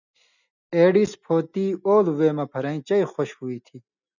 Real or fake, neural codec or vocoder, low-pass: real; none; 7.2 kHz